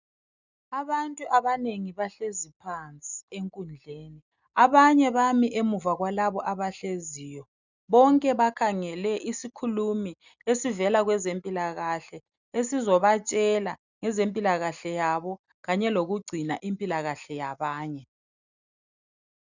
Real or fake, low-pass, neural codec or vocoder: real; 7.2 kHz; none